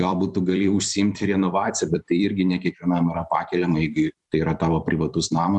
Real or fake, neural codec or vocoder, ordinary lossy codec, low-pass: fake; vocoder, 44.1 kHz, 128 mel bands every 256 samples, BigVGAN v2; MP3, 96 kbps; 10.8 kHz